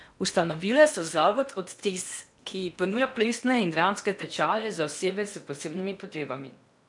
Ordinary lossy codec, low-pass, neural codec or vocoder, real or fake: none; 10.8 kHz; codec, 16 kHz in and 24 kHz out, 0.8 kbps, FocalCodec, streaming, 65536 codes; fake